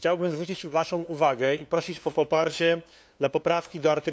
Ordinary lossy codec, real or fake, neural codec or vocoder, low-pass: none; fake; codec, 16 kHz, 2 kbps, FunCodec, trained on LibriTTS, 25 frames a second; none